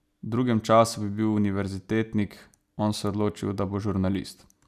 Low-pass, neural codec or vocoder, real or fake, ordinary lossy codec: 14.4 kHz; none; real; none